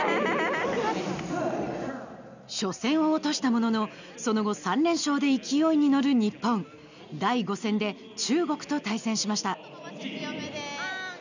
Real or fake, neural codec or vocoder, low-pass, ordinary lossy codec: real; none; 7.2 kHz; none